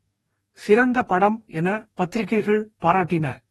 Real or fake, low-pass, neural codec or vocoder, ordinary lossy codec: fake; 19.8 kHz; codec, 44.1 kHz, 2.6 kbps, DAC; AAC, 32 kbps